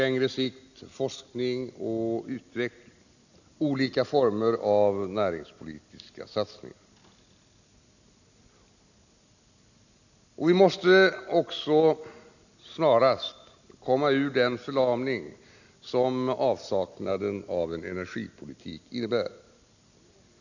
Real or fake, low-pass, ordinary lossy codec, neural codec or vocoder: real; 7.2 kHz; none; none